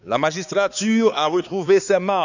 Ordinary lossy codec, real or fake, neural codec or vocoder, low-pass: none; fake; codec, 16 kHz, 4 kbps, X-Codec, HuBERT features, trained on balanced general audio; 7.2 kHz